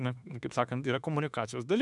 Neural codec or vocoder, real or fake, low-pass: autoencoder, 48 kHz, 32 numbers a frame, DAC-VAE, trained on Japanese speech; fake; 10.8 kHz